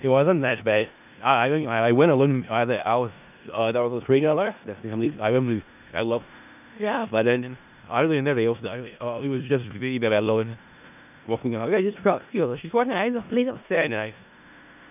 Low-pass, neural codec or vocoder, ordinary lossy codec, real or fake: 3.6 kHz; codec, 16 kHz in and 24 kHz out, 0.4 kbps, LongCat-Audio-Codec, four codebook decoder; none; fake